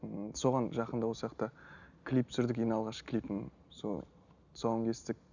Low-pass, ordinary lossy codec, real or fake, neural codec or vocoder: 7.2 kHz; none; real; none